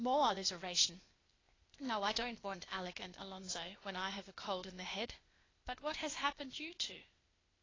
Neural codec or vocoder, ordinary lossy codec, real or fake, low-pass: codec, 16 kHz, 0.8 kbps, ZipCodec; AAC, 32 kbps; fake; 7.2 kHz